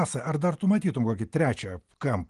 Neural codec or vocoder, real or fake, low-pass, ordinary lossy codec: none; real; 10.8 kHz; Opus, 24 kbps